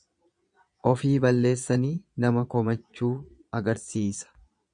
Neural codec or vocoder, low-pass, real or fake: vocoder, 22.05 kHz, 80 mel bands, Vocos; 9.9 kHz; fake